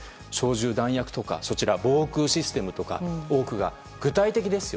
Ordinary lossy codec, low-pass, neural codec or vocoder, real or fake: none; none; none; real